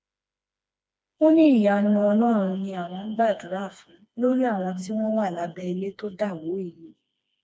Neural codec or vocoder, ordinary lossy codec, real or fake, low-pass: codec, 16 kHz, 2 kbps, FreqCodec, smaller model; none; fake; none